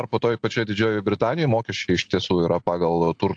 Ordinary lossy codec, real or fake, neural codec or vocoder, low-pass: AAC, 64 kbps; real; none; 9.9 kHz